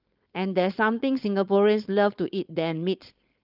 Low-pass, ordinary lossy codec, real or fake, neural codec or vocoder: 5.4 kHz; Opus, 24 kbps; fake; codec, 16 kHz, 4.8 kbps, FACodec